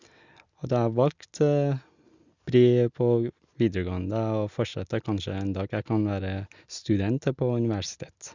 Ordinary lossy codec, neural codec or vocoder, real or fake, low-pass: Opus, 64 kbps; none; real; 7.2 kHz